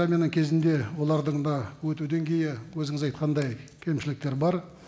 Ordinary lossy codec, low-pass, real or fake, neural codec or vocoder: none; none; real; none